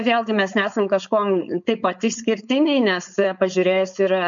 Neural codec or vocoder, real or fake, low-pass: codec, 16 kHz, 4.8 kbps, FACodec; fake; 7.2 kHz